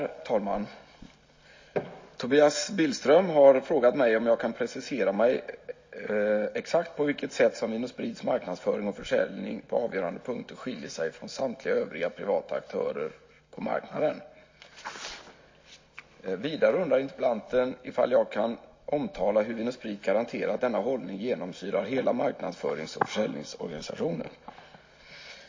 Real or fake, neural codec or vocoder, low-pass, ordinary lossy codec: real; none; 7.2 kHz; MP3, 32 kbps